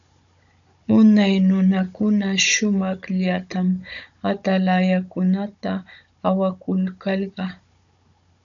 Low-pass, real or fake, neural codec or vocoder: 7.2 kHz; fake; codec, 16 kHz, 16 kbps, FunCodec, trained on Chinese and English, 50 frames a second